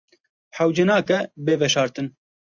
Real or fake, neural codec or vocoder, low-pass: real; none; 7.2 kHz